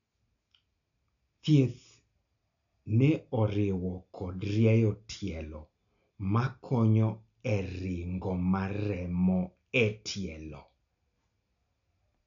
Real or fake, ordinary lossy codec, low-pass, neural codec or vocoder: real; none; 7.2 kHz; none